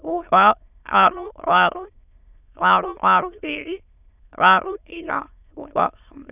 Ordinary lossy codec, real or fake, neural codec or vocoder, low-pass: none; fake; autoencoder, 22.05 kHz, a latent of 192 numbers a frame, VITS, trained on many speakers; 3.6 kHz